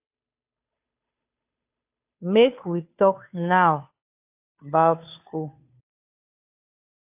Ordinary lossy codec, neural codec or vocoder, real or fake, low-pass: AAC, 32 kbps; codec, 16 kHz, 2 kbps, FunCodec, trained on Chinese and English, 25 frames a second; fake; 3.6 kHz